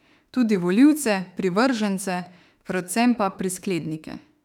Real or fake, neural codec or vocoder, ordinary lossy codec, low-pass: fake; autoencoder, 48 kHz, 32 numbers a frame, DAC-VAE, trained on Japanese speech; none; 19.8 kHz